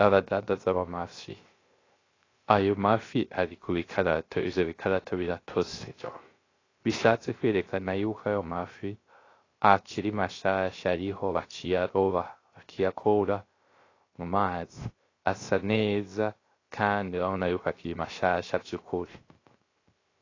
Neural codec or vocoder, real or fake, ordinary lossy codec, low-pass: codec, 16 kHz, 0.3 kbps, FocalCodec; fake; AAC, 32 kbps; 7.2 kHz